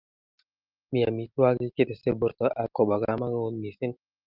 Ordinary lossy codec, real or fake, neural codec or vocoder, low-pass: Opus, 24 kbps; real; none; 5.4 kHz